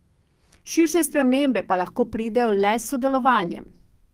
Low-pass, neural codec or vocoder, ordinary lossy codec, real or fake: 14.4 kHz; codec, 32 kHz, 1.9 kbps, SNAC; Opus, 32 kbps; fake